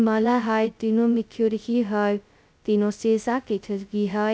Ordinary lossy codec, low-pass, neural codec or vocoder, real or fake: none; none; codec, 16 kHz, 0.2 kbps, FocalCodec; fake